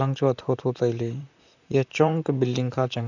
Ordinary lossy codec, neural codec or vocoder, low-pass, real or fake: none; vocoder, 44.1 kHz, 128 mel bands, Pupu-Vocoder; 7.2 kHz; fake